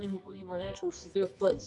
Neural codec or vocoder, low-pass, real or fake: codec, 24 kHz, 0.9 kbps, WavTokenizer, medium music audio release; 10.8 kHz; fake